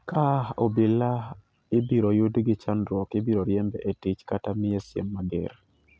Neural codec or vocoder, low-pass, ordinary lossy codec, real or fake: none; none; none; real